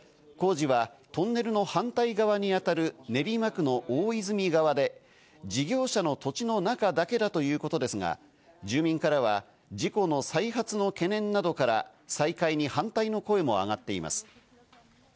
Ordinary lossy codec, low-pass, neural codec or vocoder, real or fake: none; none; none; real